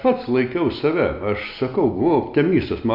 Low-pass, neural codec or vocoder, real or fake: 5.4 kHz; none; real